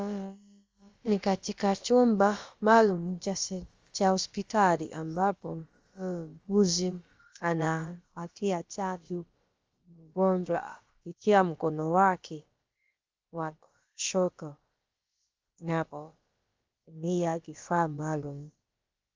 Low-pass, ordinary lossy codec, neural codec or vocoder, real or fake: 7.2 kHz; Opus, 32 kbps; codec, 16 kHz, about 1 kbps, DyCAST, with the encoder's durations; fake